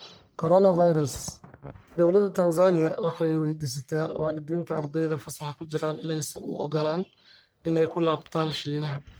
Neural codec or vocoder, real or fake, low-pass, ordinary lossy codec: codec, 44.1 kHz, 1.7 kbps, Pupu-Codec; fake; none; none